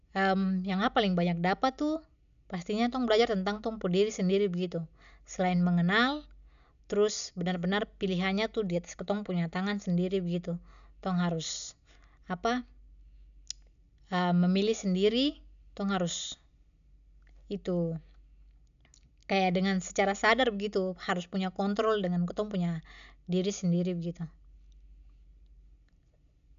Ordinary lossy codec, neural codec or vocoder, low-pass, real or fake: none; none; 7.2 kHz; real